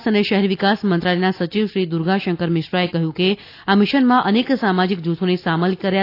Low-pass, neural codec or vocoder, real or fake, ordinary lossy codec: 5.4 kHz; none; real; AAC, 32 kbps